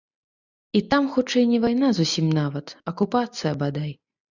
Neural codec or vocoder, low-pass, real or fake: none; 7.2 kHz; real